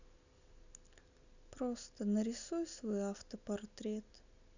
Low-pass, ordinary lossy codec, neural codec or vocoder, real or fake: 7.2 kHz; none; none; real